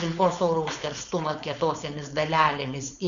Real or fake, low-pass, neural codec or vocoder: fake; 7.2 kHz; codec, 16 kHz, 4.8 kbps, FACodec